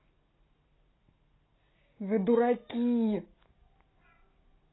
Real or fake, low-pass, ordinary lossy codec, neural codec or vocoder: real; 7.2 kHz; AAC, 16 kbps; none